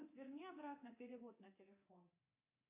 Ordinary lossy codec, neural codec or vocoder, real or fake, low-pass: MP3, 24 kbps; codec, 24 kHz, 3.1 kbps, DualCodec; fake; 3.6 kHz